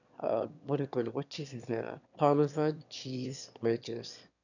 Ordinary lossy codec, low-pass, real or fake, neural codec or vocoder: none; 7.2 kHz; fake; autoencoder, 22.05 kHz, a latent of 192 numbers a frame, VITS, trained on one speaker